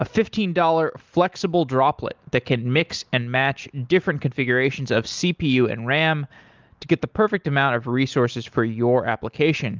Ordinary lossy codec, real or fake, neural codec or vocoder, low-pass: Opus, 24 kbps; real; none; 7.2 kHz